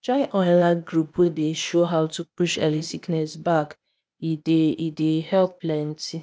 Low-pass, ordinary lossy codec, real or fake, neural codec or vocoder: none; none; fake; codec, 16 kHz, 0.8 kbps, ZipCodec